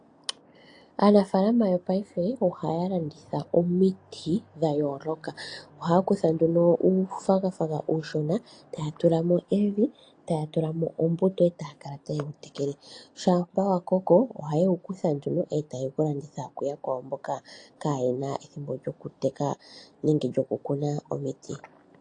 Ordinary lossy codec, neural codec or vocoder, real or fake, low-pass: AAC, 48 kbps; none; real; 9.9 kHz